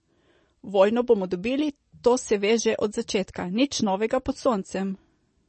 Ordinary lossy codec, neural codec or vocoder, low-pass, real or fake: MP3, 32 kbps; none; 10.8 kHz; real